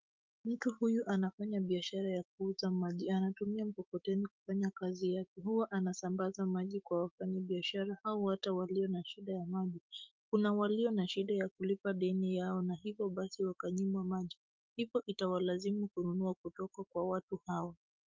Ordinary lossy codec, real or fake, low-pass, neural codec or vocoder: Opus, 32 kbps; fake; 7.2 kHz; autoencoder, 48 kHz, 128 numbers a frame, DAC-VAE, trained on Japanese speech